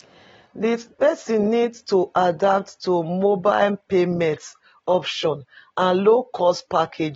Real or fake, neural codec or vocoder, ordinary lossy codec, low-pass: real; none; AAC, 24 kbps; 19.8 kHz